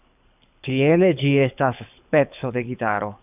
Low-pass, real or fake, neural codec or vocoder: 3.6 kHz; fake; codec, 24 kHz, 6 kbps, HILCodec